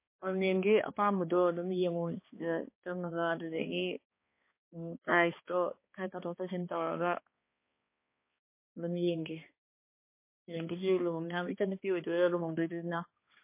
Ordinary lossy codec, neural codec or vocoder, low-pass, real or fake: MP3, 24 kbps; codec, 16 kHz, 2 kbps, X-Codec, HuBERT features, trained on balanced general audio; 3.6 kHz; fake